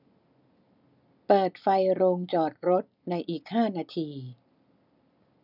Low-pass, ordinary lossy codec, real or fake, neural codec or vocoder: 5.4 kHz; none; real; none